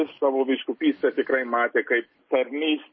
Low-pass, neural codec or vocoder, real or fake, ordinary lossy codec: 7.2 kHz; none; real; MP3, 24 kbps